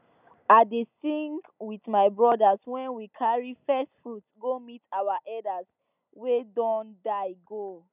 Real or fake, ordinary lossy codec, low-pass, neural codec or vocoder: real; none; 3.6 kHz; none